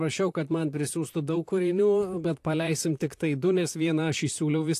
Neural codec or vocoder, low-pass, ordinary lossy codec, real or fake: vocoder, 44.1 kHz, 128 mel bands, Pupu-Vocoder; 14.4 kHz; AAC, 64 kbps; fake